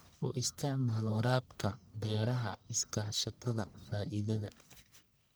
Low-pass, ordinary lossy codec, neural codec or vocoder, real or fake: none; none; codec, 44.1 kHz, 1.7 kbps, Pupu-Codec; fake